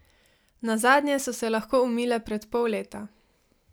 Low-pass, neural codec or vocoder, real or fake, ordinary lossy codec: none; none; real; none